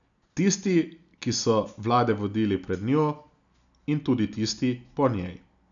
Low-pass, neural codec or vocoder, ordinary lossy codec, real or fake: 7.2 kHz; none; none; real